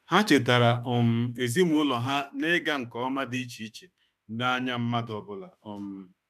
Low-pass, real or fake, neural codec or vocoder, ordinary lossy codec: 14.4 kHz; fake; autoencoder, 48 kHz, 32 numbers a frame, DAC-VAE, trained on Japanese speech; none